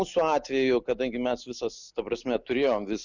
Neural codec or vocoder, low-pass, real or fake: none; 7.2 kHz; real